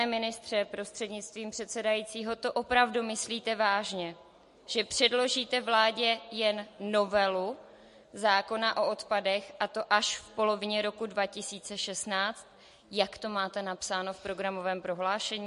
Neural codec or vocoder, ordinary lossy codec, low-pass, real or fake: none; MP3, 48 kbps; 14.4 kHz; real